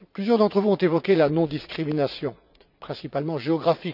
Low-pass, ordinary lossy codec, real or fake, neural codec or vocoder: 5.4 kHz; none; fake; vocoder, 44.1 kHz, 80 mel bands, Vocos